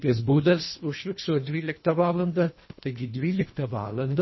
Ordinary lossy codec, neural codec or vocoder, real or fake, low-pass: MP3, 24 kbps; codec, 24 kHz, 1.5 kbps, HILCodec; fake; 7.2 kHz